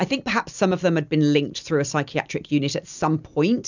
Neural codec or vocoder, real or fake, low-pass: none; real; 7.2 kHz